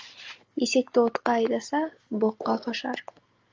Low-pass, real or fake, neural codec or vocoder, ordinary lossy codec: 7.2 kHz; real; none; Opus, 32 kbps